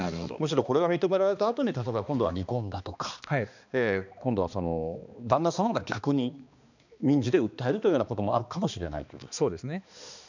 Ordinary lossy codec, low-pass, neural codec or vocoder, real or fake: none; 7.2 kHz; codec, 16 kHz, 2 kbps, X-Codec, HuBERT features, trained on balanced general audio; fake